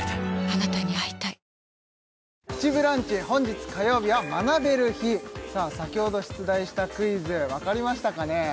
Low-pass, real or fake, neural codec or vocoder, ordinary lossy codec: none; real; none; none